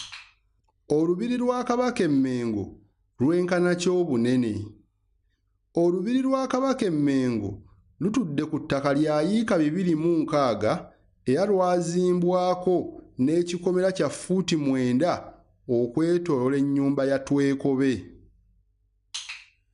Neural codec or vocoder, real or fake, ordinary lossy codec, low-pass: none; real; none; 10.8 kHz